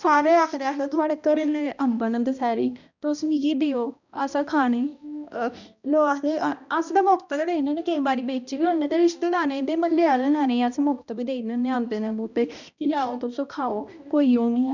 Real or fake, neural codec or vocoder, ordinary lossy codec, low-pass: fake; codec, 16 kHz, 1 kbps, X-Codec, HuBERT features, trained on balanced general audio; none; 7.2 kHz